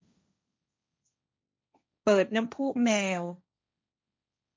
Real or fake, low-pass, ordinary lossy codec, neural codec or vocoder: fake; none; none; codec, 16 kHz, 1.1 kbps, Voila-Tokenizer